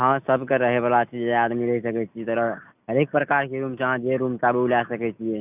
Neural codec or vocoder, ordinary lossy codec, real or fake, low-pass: none; none; real; 3.6 kHz